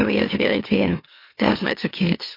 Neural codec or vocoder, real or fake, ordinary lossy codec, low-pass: autoencoder, 44.1 kHz, a latent of 192 numbers a frame, MeloTTS; fake; MP3, 32 kbps; 5.4 kHz